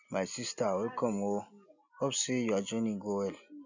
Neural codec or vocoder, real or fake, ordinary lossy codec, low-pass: none; real; none; 7.2 kHz